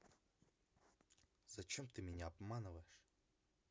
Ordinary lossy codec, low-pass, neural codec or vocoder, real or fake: none; none; none; real